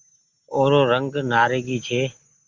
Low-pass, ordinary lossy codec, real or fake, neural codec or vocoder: 7.2 kHz; Opus, 32 kbps; real; none